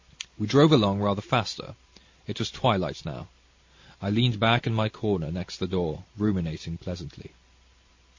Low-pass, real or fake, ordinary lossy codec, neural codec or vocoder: 7.2 kHz; real; MP3, 48 kbps; none